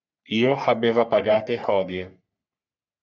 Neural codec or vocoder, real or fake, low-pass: codec, 44.1 kHz, 3.4 kbps, Pupu-Codec; fake; 7.2 kHz